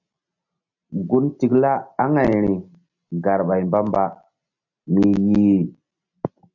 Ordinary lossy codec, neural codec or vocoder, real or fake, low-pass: MP3, 64 kbps; none; real; 7.2 kHz